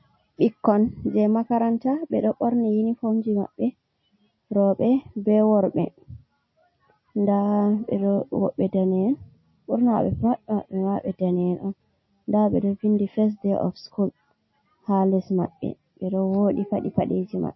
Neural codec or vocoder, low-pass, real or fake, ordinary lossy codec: none; 7.2 kHz; real; MP3, 24 kbps